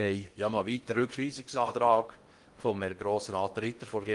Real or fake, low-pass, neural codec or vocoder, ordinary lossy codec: fake; 10.8 kHz; codec, 16 kHz in and 24 kHz out, 0.8 kbps, FocalCodec, streaming, 65536 codes; Opus, 24 kbps